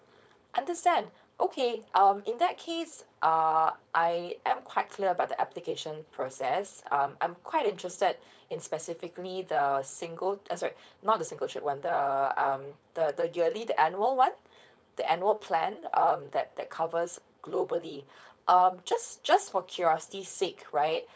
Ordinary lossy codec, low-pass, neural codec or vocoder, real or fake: none; none; codec, 16 kHz, 4.8 kbps, FACodec; fake